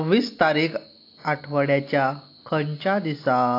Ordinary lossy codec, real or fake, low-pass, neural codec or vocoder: AAC, 32 kbps; real; 5.4 kHz; none